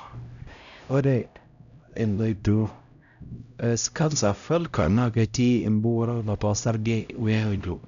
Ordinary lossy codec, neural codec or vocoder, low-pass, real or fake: none; codec, 16 kHz, 0.5 kbps, X-Codec, HuBERT features, trained on LibriSpeech; 7.2 kHz; fake